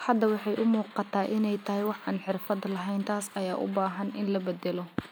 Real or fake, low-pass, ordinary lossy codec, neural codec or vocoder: real; none; none; none